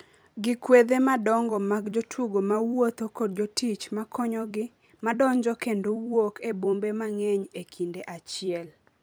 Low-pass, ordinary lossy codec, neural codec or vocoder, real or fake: none; none; vocoder, 44.1 kHz, 128 mel bands every 256 samples, BigVGAN v2; fake